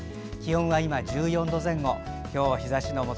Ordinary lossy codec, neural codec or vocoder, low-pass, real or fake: none; none; none; real